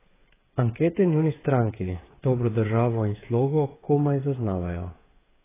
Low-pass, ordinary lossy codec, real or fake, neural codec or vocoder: 3.6 kHz; AAC, 16 kbps; fake; codec, 16 kHz, 8 kbps, FreqCodec, smaller model